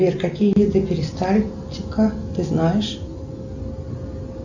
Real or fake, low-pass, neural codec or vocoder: real; 7.2 kHz; none